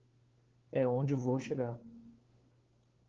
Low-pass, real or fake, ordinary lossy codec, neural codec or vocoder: 7.2 kHz; fake; Opus, 16 kbps; codec, 16 kHz, 4 kbps, FunCodec, trained on LibriTTS, 50 frames a second